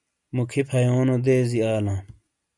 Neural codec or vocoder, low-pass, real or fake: none; 10.8 kHz; real